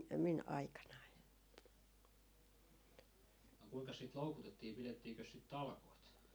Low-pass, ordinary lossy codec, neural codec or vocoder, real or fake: none; none; none; real